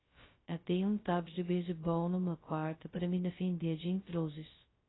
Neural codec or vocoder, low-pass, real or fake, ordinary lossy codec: codec, 16 kHz, 0.2 kbps, FocalCodec; 7.2 kHz; fake; AAC, 16 kbps